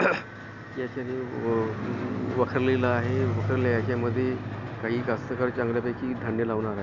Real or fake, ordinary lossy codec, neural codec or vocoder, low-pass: real; none; none; 7.2 kHz